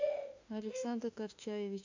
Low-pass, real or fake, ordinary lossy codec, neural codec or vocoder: 7.2 kHz; fake; AAC, 48 kbps; autoencoder, 48 kHz, 32 numbers a frame, DAC-VAE, trained on Japanese speech